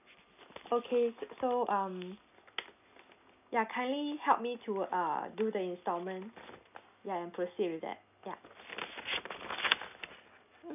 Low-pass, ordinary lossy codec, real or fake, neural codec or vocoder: 3.6 kHz; none; real; none